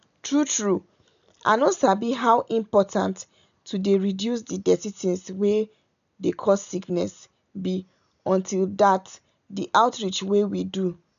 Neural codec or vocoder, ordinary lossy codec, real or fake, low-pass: none; none; real; 7.2 kHz